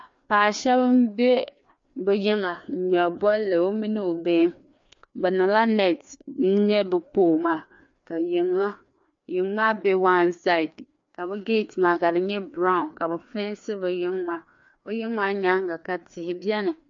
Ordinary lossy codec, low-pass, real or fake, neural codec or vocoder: MP3, 48 kbps; 7.2 kHz; fake; codec, 16 kHz, 2 kbps, FreqCodec, larger model